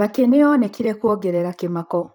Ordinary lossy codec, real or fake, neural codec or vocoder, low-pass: none; fake; vocoder, 44.1 kHz, 128 mel bands, Pupu-Vocoder; 19.8 kHz